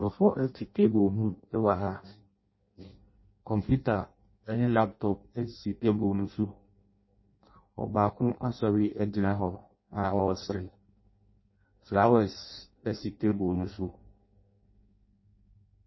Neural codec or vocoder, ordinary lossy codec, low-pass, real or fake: codec, 16 kHz in and 24 kHz out, 0.6 kbps, FireRedTTS-2 codec; MP3, 24 kbps; 7.2 kHz; fake